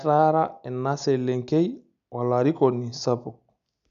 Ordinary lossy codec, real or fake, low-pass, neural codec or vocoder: none; real; 7.2 kHz; none